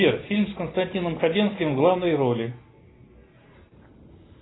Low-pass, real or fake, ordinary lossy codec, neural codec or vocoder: 7.2 kHz; real; AAC, 16 kbps; none